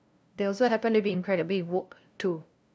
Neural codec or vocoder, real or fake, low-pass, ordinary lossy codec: codec, 16 kHz, 0.5 kbps, FunCodec, trained on LibriTTS, 25 frames a second; fake; none; none